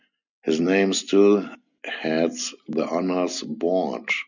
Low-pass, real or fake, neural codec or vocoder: 7.2 kHz; real; none